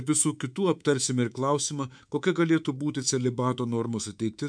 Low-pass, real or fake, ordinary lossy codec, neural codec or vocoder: 9.9 kHz; fake; MP3, 96 kbps; codec, 24 kHz, 3.1 kbps, DualCodec